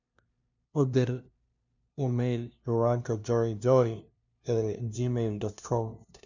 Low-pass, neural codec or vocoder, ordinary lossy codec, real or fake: 7.2 kHz; codec, 16 kHz, 0.5 kbps, FunCodec, trained on LibriTTS, 25 frames a second; none; fake